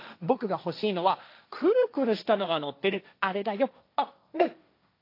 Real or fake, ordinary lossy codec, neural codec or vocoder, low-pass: fake; AAC, 32 kbps; codec, 16 kHz, 1.1 kbps, Voila-Tokenizer; 5.4 kHz